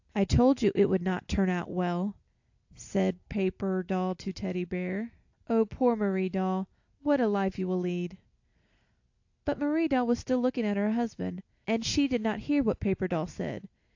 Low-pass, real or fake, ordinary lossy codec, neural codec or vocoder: 7.2 kHz; real; AAC, 48 kbps; none